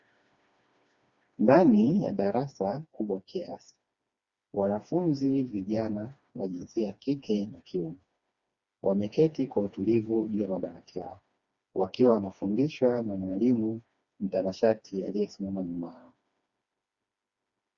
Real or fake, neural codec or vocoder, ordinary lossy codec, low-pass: fake; codec, 16 kHz, 2 kbps, FreqCodec, smaller model; Opus, 64 kbps; 7.2 kHz